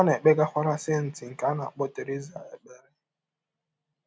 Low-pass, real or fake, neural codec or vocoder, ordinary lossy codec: none; real; none; none